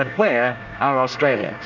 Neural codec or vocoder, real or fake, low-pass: codec, 24 kHz, 1 kbps, SNAC; fake; 7.2 kHz